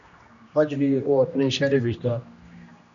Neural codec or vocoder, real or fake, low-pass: codec, 16 kHz, 1 kbps, X-Codec, HuBERT features, trained on general audio; fake; 7.2 kHz